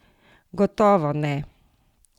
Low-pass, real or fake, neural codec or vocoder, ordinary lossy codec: 19.8 kHz; real; none; Opus, 64 kbps